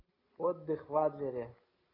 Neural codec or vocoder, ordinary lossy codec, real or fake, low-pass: none; AAC, 24 kbps; real; 5.4 kHz